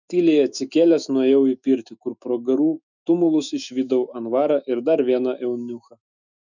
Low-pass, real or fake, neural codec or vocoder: 7.2 kHz; fake; autoencoder, 48 kHz, 128 numbers a frame, DAC-VAE, trained on Japanese speech